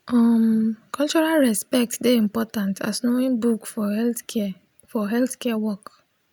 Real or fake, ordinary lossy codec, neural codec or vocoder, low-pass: real; none; none; none